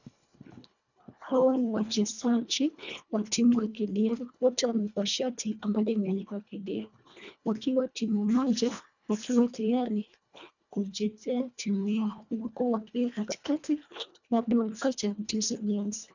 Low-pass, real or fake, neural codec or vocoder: 7.2 kHz; fake; codec, 24 kHz, 1.5 kbps, HILCodec